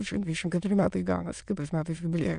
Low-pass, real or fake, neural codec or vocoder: 9.9 kHz; fake; autoencoder, 22.05 kHz, a latent of 192 numbers a frame, VITS, trained on many speakers